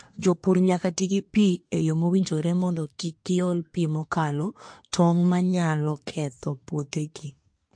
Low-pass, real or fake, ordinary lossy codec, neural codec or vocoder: 9.9 kHz; fake; MP3, 48 kbps; codec, 24 kHz, 1 kbps, SNAC